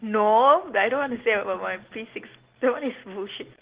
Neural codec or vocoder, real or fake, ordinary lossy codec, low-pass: none; real; Opus, 16 kbps; 3.6 kHz